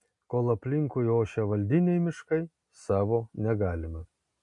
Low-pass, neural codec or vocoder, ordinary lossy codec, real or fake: 10.8 kHz; none; MP3, 48 kbps; real